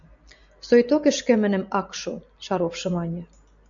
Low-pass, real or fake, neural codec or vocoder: 7.2 kHz; real; none